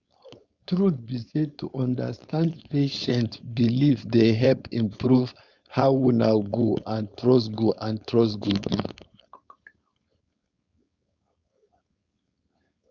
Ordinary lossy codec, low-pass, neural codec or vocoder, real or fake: Opus, 64 kbps; 7.2 kHz; codec, 16 kHz, 4.8 kbps, FACodec; fake